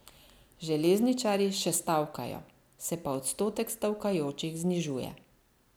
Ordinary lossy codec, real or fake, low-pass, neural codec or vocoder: none; real; none; none